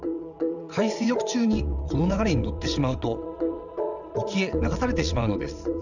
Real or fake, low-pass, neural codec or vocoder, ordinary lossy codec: fake; 7.2 kHz; vocoder, 22.05 kHz, 80 mel bands, WaveNeXt; none